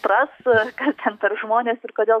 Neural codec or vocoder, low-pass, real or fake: autoencoder, 48 kHz, 128 numbers a frame, DAC-VAE, trained on Japanese speech; 14.4 kHz; fake